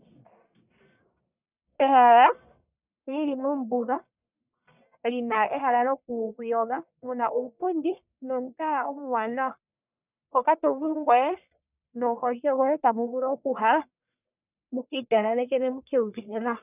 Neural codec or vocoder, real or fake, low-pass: codec, 44.1 kHz, 1.7 kbps, Pupu-Codec; fake; 3.6 kHz